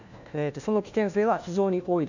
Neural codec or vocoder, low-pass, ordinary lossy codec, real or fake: codec, 16 kHz, 1 kbps, FunCodec, trained on LibriTTS, 50 frames a second; 7.2 kHz; none; fake